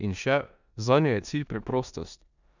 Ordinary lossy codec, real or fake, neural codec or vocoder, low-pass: none; fake; codec, 16 kHz in and 24 kHz out, 0.9 kbps, LongCat-Audio-Codec, four codebook decoder; 7.2 kHz